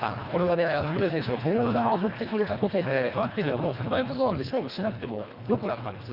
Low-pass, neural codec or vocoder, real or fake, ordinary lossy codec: 5.4 kHz; codec, 24 kHz, 1.5 kbps, HILCodec; fake; Opus, 64 kbps